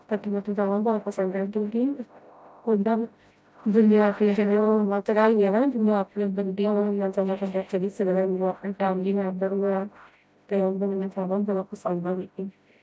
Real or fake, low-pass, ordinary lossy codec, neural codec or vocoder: fake; none; none; codec, 16 kHz, 0.5 kbps, FreqCodec, smaller model